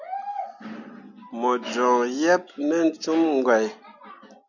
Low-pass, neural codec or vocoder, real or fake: 7.2 kHz; none; real